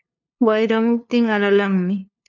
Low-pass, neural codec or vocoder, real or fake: 7.2 kHz; codec, 16 kHz, 2 kbps, FunCodec, trained on LibriTTS, 25 frames a second; fake